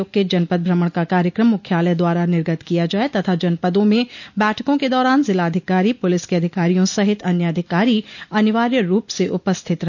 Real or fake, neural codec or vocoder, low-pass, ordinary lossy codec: real; none; 7.2 kHz; none